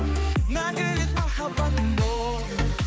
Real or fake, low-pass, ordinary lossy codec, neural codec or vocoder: fake; none; none; codec, 16 kHz, 4 kbps, X-Codec, HuBERT features, trained on balanced general audio